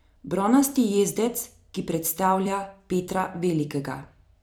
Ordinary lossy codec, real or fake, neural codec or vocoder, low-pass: none; fake; vocoder, 44.1 kHz, 128 mel bands every 256 samples, BigVGAN v2; none